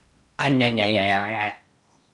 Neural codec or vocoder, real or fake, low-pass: codec, 16 kHz in and 24 kHz out, 0.8 kbps, FocalCodec, streaming, 65536 codes; fake; 10.8 kHz